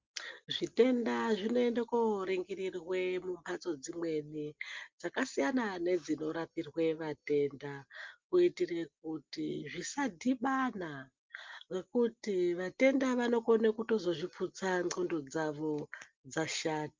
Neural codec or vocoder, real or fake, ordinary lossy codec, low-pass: none; real; Opus, 32 kbps; 7.2 kHz